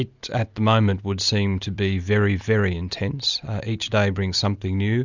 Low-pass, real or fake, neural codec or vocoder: 7.2 kHz; real; none